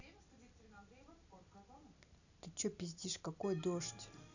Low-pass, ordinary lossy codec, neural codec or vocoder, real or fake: 7.2 kHz; none; none; real